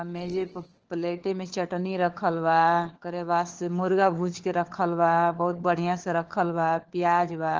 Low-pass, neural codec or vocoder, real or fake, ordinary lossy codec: 7.2 kHz; codec, 16 kHz, 4 kbps, FunCodec, trained on LibriTTS, 50 frames a second; fake; Opus, 16 kbps